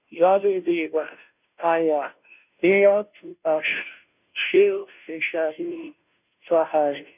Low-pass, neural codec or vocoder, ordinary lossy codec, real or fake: 3.6 kHz; codec, 16 kHz, 0.5 kbps, FunCodec, trained on Chinese and English, 25 frames a second; none; fake